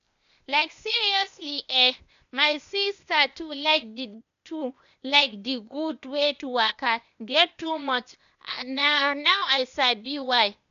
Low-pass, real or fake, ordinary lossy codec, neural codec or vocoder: 7.2 kHz; fake; MP3, 96 kbps; codec, 16 kHz, 0.8 kbps, ZipCodec